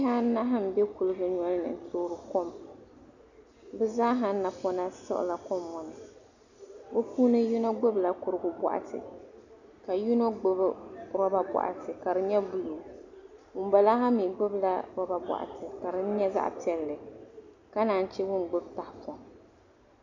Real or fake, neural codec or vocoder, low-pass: real; none; 7.2 kHz